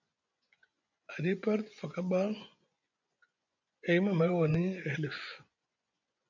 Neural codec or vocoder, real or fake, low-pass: vocoder, 24 kHz, 100 mel bands, Vocos; fake; 7.2 kHz